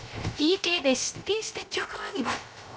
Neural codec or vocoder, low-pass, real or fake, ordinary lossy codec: codec, 16 kHz, 0.3 kbps, FocalCodec; none; fake; none